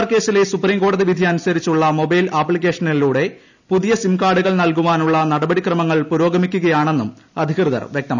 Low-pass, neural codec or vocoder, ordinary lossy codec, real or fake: 7.2 kHz; none; none; real